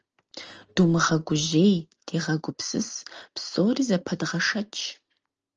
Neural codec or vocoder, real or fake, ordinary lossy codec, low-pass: none; real; Opus, 24 kbps; 7.2 kHz